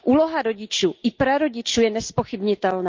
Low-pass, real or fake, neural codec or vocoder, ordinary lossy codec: 7.2 kHz; real; none; Opus, 24 kbps